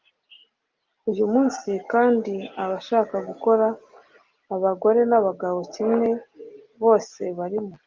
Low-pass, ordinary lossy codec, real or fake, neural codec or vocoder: 7.2 kHz; Opus, 24 kbps; real; none